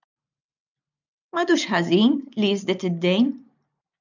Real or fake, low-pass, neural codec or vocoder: real; 7.2 kHz; none